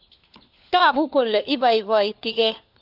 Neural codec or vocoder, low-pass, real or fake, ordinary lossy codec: codec, 16 kHz, 4 kbps, FunCodec, trained on LibriTTS, 50 frames a second; 5.4 kHz; fake; AAC, 48 kbps